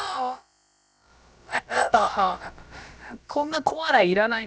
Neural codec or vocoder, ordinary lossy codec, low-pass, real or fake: codec, 16 kHz, about 1 kbps, DyCAST, with the encoder's durations; none; none; fake